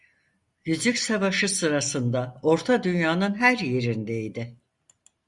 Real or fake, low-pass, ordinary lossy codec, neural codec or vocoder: real; 10.8 kHz; Opus, 64 kbps; none